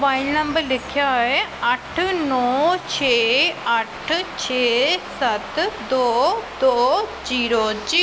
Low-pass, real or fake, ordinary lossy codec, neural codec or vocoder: none; real; none; none